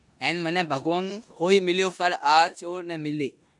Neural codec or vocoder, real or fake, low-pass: codec, 16 kHz in and 24 kHz out, 0.9 kbps, LongCat-Audio-Codec, four codebook decoder; fake; 10.8 kHz